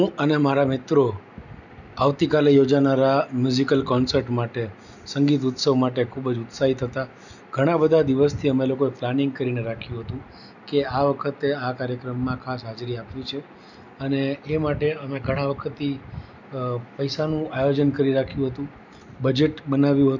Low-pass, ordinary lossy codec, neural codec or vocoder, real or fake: 7.2 kHz; none; none; real